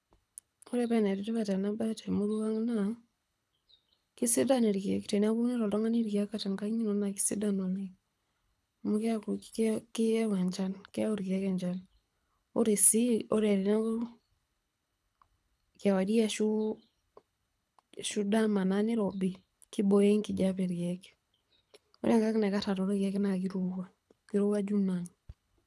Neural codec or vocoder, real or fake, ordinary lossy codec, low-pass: codec, 24 kHz, 6 kbps, HILCodec; fake; none; none